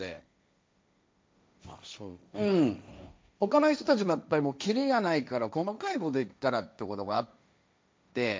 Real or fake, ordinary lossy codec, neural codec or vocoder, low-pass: fake; none; codec, 16 kHz, 1.1 kbps, Voila-Tokenizer; none